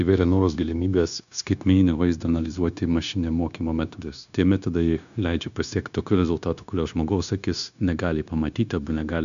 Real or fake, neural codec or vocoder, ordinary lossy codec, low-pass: fake; codec, 16 kHz, 0.9 kbps, LongCat-Audio-Codec; MP3, 96 kbps; 7.2 kHz